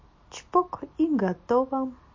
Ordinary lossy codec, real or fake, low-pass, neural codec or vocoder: MP3, 32 kbps; real; 7.2 kHz; none